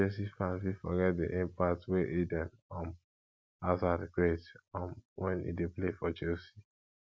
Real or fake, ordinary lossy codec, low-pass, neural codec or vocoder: real; none; none; none